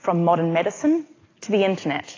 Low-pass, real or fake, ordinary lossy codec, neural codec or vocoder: 7.2 kHz; real; AAC, 32 kbps; none